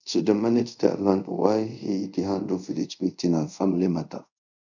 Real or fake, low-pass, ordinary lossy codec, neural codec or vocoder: fake; 7.2 kHz; none; codec, 24 kHz, 0.5 kbps, DualCodec